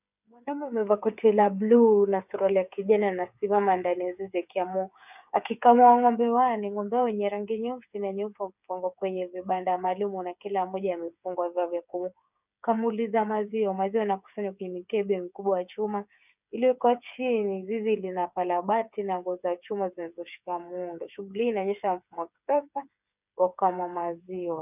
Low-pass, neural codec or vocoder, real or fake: 3.6 kHz; codec, 16 kHz, 8 kbps, FreqCodec, smaller model; fake